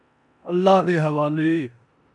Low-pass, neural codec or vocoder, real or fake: 10.8 kHz; codec, 16 kHz in and 24 kHz out, 0.9 kbps, LongCat-Audio-Codec, four codebook decoder; fake